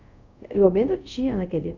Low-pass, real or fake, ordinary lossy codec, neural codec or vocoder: 7.2 kHz; fake; none; codec, 24 kHz, 0.5 kbps, DualCodec